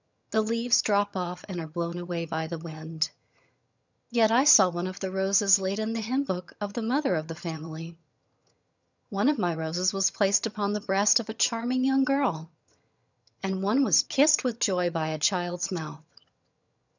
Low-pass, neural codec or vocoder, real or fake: 7.2 kHz; vocoder, 22.05 kHz, 80 mel bands, HiFi-GAN; fake